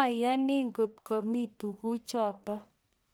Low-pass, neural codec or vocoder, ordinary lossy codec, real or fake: none; codec, 44.1 kHz, 1.7 kbps, Pupu-Codec; none; fake